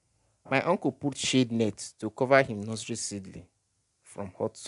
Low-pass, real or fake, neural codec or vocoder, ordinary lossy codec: 10.8 kHz; real; none; AAC, 96 kbps